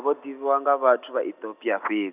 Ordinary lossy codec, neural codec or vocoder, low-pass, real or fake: none; none; 3.6 kHz; real